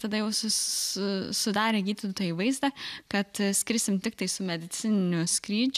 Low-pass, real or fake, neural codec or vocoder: 14.4 kHz; real; none